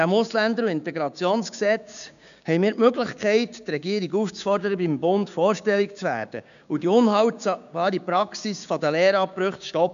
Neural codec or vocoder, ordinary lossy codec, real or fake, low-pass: codec, 16 kHz, 6 kbps, DAC; none; fake; 7.2 kHz